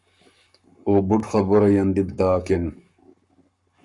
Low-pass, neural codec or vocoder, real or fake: 10.8 kHz; codec, 44.1 kHz, 7.8 kbps, Pupu-Codec; fake